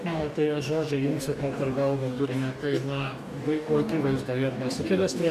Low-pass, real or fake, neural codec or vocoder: 14.4 kHz; fake; codec, 44.1 kHz, 2.6 kbps, DAC